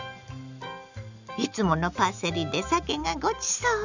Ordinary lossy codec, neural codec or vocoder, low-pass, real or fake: none; none; 7.2 kHz; real